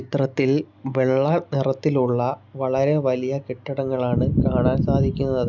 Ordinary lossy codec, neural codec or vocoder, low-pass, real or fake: none; none; 7.2 kHz; real